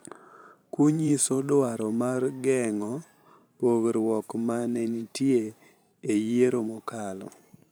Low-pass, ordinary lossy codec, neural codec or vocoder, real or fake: none; none; vocoder, 44.1 kHz, 128 mel bands every 512 samples, BigVGAN v2; fake